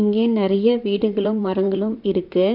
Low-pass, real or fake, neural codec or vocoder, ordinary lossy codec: 5.4 kHz; fake; vocoder, 44.1 kHz, 128 mel bands, Pupu-Vocoder; MP3, 48 kbps